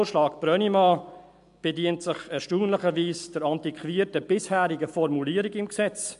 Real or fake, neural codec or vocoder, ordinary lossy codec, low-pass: real; none; MP3, 64 kbps; 10.8 kHz